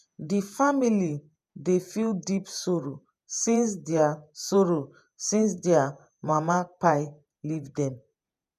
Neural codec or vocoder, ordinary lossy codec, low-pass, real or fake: vocoder, 48 kHz, 128 mel bands, Vocos; none; 14.4 kHz; fake